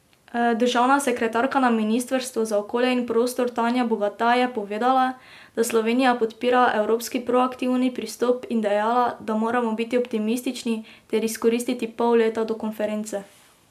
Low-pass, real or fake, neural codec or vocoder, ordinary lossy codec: 14.4 kHz; real; none; MP3, 96 kbps